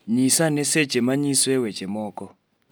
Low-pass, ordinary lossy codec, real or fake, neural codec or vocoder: none; none; real; none